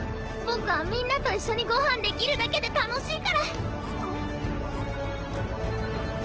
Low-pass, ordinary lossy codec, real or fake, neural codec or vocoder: 7.2 kHz; Opus, 16 kbps; real; none